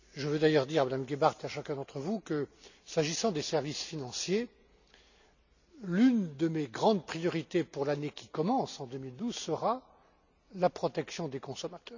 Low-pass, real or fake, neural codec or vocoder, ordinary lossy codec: 7.2 kHz; real; none; none